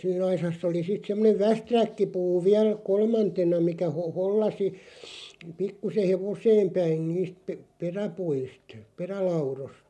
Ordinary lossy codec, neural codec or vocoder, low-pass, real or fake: none; none; none; real